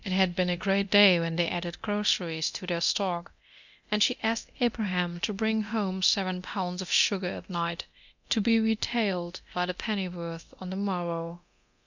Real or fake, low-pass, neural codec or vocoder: fake; 7.2 kHz; codec, 24 kHz, 0.9 kbps, DualCodec